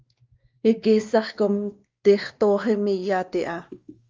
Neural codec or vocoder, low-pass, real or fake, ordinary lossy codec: codec, 16 kHz, 2 kbps, X-Codec, WavLM features, trained on Multilingual LibriSpeech; 7.2 kHz; fake; Opus, 32 kbps